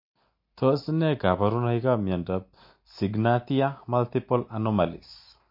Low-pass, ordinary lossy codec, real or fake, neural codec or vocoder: 5.4 kHz; MP3, 32 kbps; real; none